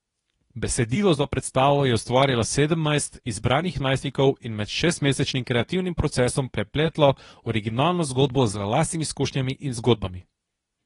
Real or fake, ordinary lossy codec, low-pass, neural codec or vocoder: fake; AAC, 32 kbps; 10.8 kHz; codec, 24 kHz, 0.9 kbps, WavTokenizer, medium speech release version 2